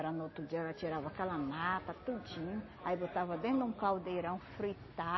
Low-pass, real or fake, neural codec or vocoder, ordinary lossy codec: 7.2 kHz; real; none; MP3, 24 kbps